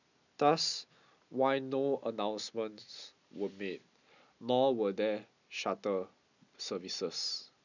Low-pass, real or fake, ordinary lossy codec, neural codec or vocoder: 7.2 kHz; real; none; none